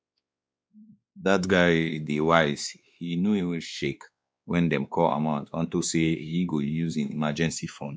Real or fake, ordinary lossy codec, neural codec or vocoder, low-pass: fake; none; codec, 16 kHz, 2 kbps, X-Codec, WavLM features, trained on Multilingual LibriSpeech; none